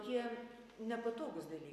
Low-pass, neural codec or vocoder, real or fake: 14.4 kHz; none; real